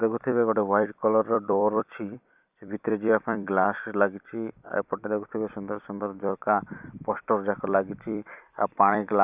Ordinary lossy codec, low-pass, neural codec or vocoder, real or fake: none; 3.6 kHz; vocoder, 44.1 kHz, 128 mel bands every 256 samples, BigVGAN v2; fake